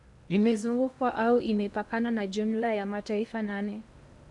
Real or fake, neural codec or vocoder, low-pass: fake; codec, 16 kHz in and 24 kHz out, 0.8 kbps, FocalCodec, streaming, 65536 codes; 10.8 kHz